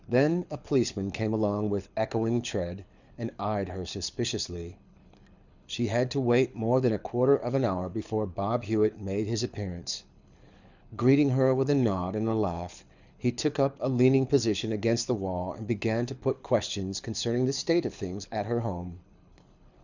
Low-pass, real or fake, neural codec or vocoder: 7.2 kHz; fake; codec, 24 kHz, 6 kbps, HILCodec